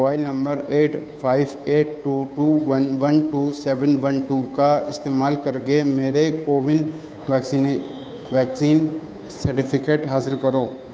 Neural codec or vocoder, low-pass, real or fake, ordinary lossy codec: codec, 16 kHz, 2 kbps, FunCodec, trained on Chinese and English, 25 frames a second; none; fake; none